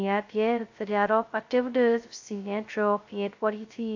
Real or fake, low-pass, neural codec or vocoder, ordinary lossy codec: fake; 7.2 kHz; codec, 16 kHz, 0.2 kbps, FocalCodec; AAC, 48 kbps